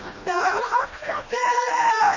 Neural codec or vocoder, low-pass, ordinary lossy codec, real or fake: codec, 16 kHz, 1 kbps, FreqCodec, smaller model; 7.2 kHz; none; fake